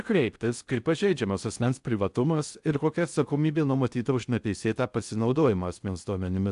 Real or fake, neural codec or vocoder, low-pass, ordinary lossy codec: fake; codec, 16 kHz in and 24 kHz out, 0.6 kbps, FocalCodec, streaming, 4096 codes; 10.8 kHz; AAC, 96 kbps